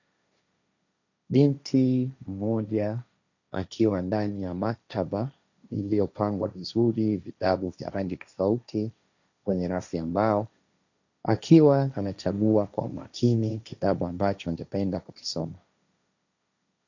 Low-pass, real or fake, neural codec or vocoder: 7.2 kHz; fake; codec, 16 kHz, 1.1 kbps, Voila-Tokenizer